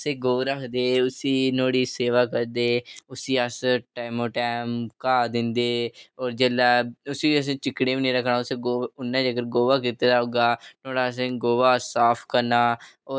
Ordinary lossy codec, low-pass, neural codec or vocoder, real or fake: none; none; none; real